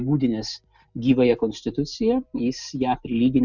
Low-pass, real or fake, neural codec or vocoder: 7.2 kHz; real; none